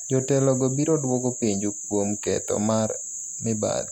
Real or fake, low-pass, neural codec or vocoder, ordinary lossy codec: real; 19.8 kHz; none; none